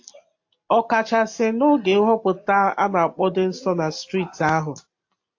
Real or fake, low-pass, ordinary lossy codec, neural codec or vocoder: real; 7.2 kHz; AAC, 48 kbps; none